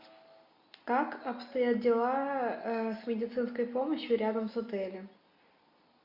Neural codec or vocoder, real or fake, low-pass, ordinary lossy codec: none; real; 5.4 kHz; MP3, 48 kbps